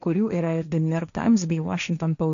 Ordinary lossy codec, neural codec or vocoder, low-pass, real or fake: MP3, 96 kbps; codec, 16 kHz, 1.1 kbps, Voila-Tokenizer; 7.2 kHz; fake